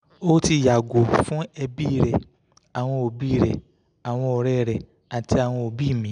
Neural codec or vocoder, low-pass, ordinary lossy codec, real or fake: none; 9.9 kHz; none; real